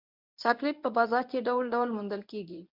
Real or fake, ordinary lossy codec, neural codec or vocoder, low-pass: fake; MP3, 48 kbps; codec, 24 kHz, 0.9 kbps, WavTokenizer, medium speech release version 1; 5.4 kHz